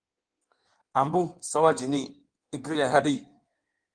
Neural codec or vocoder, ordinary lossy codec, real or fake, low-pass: codec, 16 kHz in and 24 kHz out, 1.1 kbps, FireRedTTS-2 codec; Opus, 24 kbps; fake; 9.9 kHz